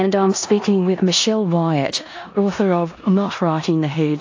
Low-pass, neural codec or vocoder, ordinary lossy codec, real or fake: 7.2 kHz; codec, 16 kHz in and 24 kHz out, 0.9 kbps, LongCat-Audio-Codec, four codebook decoder; AAC, 48 kbps; fake